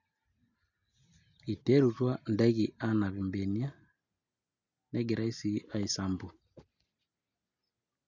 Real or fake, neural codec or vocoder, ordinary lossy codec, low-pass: real; none; none; 7.2 kHz